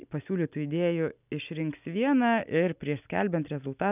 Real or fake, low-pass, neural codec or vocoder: real; 3.6 kHz; none